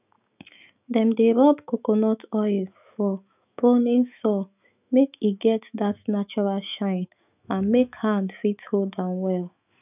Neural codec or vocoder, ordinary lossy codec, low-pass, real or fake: autoencoder, 48 kHz, 128 numbers a frame, DAC-VAE, trained on Japanese speech; none; 3.6 kHz; fake